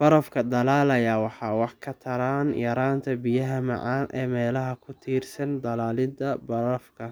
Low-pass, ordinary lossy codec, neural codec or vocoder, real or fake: none; none; none; real